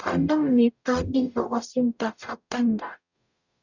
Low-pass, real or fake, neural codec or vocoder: 7.2 kHz; fake; codec, 44.1 kHz, 0.9 kbps, DAC